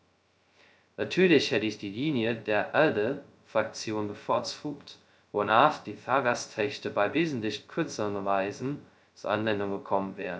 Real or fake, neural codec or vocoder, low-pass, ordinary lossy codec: fake; codec, 16 kHz, 0.2 kbps, FocalCodec; none; none